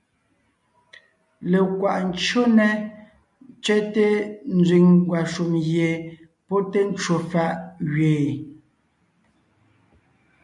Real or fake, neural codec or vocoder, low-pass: real; none; 10.8 kHz